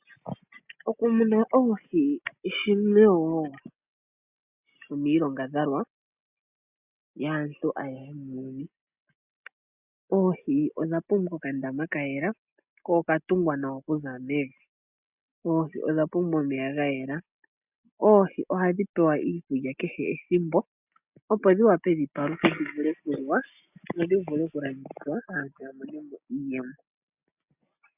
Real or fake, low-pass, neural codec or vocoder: real; 3.6 kHz; none